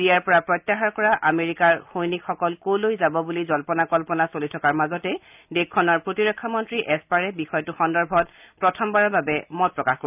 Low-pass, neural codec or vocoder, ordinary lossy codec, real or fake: 3.6 kHz; none; none; real